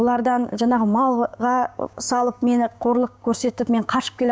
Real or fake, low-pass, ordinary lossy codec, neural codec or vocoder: fake; none; none; codec, 16 kHz, 6 kbps, DAC